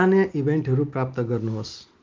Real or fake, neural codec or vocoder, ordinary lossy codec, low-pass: real; none; Opus, 32 kbps; 7.2 kHz